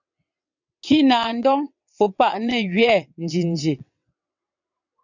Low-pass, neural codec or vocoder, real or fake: 7.2 kHz; vocoder, 22.05 kHz, 80 mel bands, WaveNeXt; fake